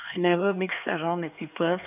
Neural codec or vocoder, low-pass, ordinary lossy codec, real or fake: codec, 16 kHz, 4 kbps, X-Codec, HuBERT features, trained on LibriSpeech; 3.6 kHz; none; fake